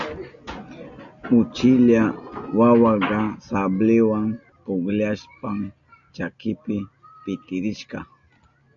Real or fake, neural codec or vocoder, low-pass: real; none; 7.2 kHz